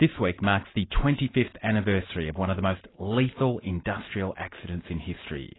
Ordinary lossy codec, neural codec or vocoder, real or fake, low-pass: AAC, 16 kbps; none; real; 7.2 kHz